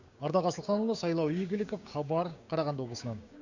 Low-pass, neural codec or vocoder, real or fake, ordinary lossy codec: 7.2 kHz; codec, 16 kHz, 6 kbps, DAC; fake; Opus, 64 kbps